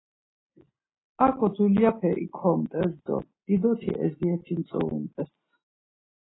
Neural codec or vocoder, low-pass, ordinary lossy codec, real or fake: none; 7.2 kHz; AAC, 16 kbps; real